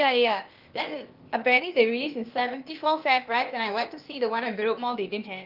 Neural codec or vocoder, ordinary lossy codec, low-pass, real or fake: codec, 16 kHz, 0.8 kbps, ZipCodec; Opus, 24 kbps; 5.4 kHz; fake